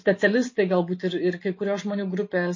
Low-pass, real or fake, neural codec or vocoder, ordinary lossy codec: 7.2 kHz; real; none; MP3, 32 kbps